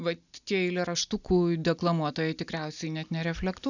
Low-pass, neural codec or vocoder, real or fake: 7.2 kHz; none; real